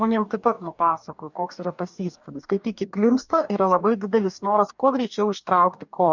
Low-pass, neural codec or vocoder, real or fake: 7.2 kHz; codec, 44.1 kHz, 2.6 kbps, DAC; fake